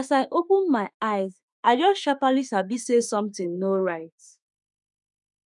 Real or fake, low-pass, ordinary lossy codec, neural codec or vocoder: fake; 10.8 kHz; none; autoencoder, 48 kHz, 32 numbers a frame, DAC-VAE, trained on Japanese speech